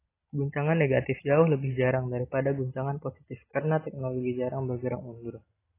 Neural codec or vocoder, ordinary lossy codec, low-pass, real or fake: none; AAC, 16 kbps; 3.6 kHz; real